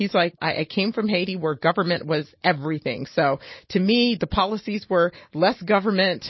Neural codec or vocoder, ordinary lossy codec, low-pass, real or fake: none; MP3, 24 kbps; 7.2 kHz; real